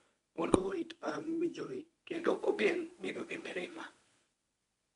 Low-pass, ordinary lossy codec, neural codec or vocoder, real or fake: 10.8 kHz; none; codec, 24 kHz, 0.9 kbps, WavTokenizer, medium speech release version 1; fake